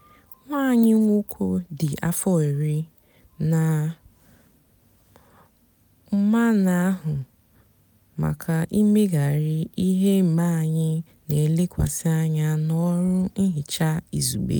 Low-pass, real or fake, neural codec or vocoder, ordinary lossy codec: none; real; none; none